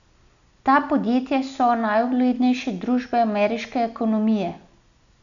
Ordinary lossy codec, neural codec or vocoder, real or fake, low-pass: none; none; real; 7.2 kHz